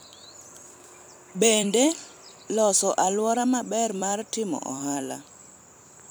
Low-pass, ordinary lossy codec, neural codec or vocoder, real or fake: none; none; vocoder, 44.1 kHz, 128 mel bands every 256 samples, BigVGAN v2; fake